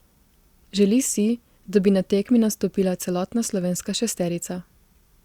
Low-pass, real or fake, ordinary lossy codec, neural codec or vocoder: 19.8 kHz; fake; Opus, 64 kbps; vocoder, 44.1 kHz, 128 mel bands every 256 samples, BigVGAN v2